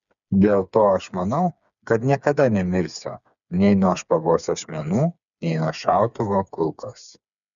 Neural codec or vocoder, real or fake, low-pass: codec, 16 kHz, 4 kbps, FreqCodec, smaller model; fake; 7.2 kHz